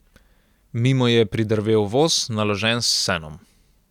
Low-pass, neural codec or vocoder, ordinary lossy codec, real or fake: 19.8 kHz; none; none; real